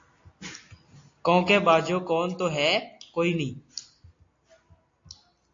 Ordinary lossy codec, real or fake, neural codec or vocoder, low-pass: AAC, 48 kbps; real; none; 7.2 kHz